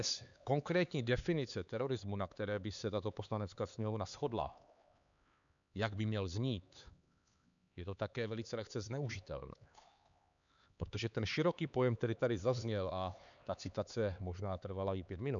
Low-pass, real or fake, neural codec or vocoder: 7.2 kHz; fake; codec, 16 kHz, 4 kbps, X-Codec, HuBERT features, trained on LibriSpeech